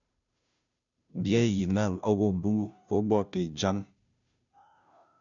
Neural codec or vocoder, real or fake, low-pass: codec, 16 kHz, 0.5 kbps, FunCodec, trained on Chinese and English, 25 frames a second; fake; 7.2 kHz